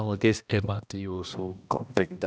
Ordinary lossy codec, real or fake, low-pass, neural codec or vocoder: none; fake; none; codec, 16 kHz, 1 kbps, X-Codec, HuBERT features, trained on balanced general audio